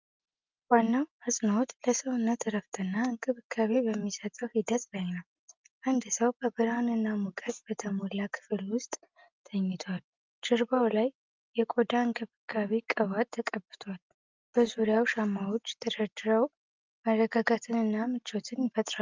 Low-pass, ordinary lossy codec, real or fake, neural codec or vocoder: 7.2 kHz; Opus, 24 kbps; real; none